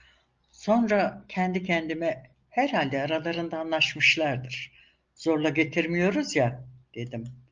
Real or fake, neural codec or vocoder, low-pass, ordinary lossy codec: real; none; 7.2 kHz; Opus, 24 kbps